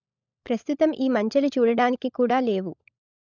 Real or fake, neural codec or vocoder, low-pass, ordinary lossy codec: fake; codec, 16 kHz, 16 kbps, FunCodec, trained on LibriTTS, 50 frames a second; 7.2 kHz; none